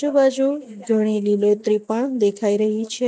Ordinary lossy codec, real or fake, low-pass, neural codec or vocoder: none; real; none; none